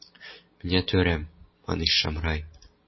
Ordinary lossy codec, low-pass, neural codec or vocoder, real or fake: MP3, 24 kbps; 7.2 kHz; none; real